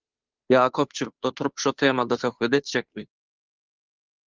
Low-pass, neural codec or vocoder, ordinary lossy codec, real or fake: 7.2 kHz; codec, 16 kHz, 2 kbps, FunCodec, trained on Chinese and English, 25 frames a second; Opus, 32 kbps; fake